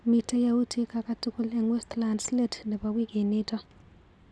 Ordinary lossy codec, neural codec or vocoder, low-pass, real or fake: none; none; none; real